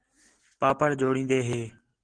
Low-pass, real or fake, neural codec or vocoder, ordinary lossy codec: 9.9 kHz; real; none; Opus, 16 kbps